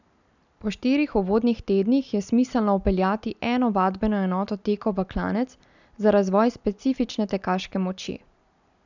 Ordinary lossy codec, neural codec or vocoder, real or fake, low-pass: none; none; real; 7.2 kHz